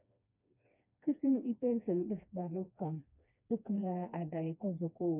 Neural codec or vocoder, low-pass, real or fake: codec, 16 kHz, 2 kbps, FreqCodec, smaller model; 3.6 kHz; fake